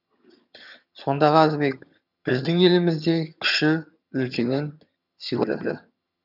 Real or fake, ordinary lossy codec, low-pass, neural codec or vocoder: fake; none; 5.4 kHz; vocoder, 22.05 kHz, 80 mel bands, HiFi-GAN